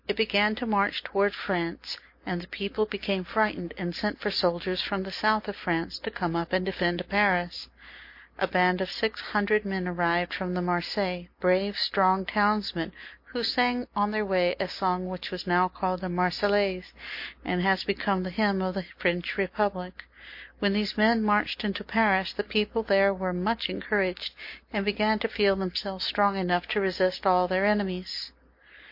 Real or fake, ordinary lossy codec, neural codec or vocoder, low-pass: real; MP3, 32 kbps; none; 5.4 kHz